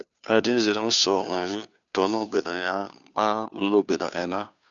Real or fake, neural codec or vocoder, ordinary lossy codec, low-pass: fake; codec, 16 kHz, 2 kbps, FunCodec, trained on Chinese and English, 25 frames a second; none; 7.2 kHz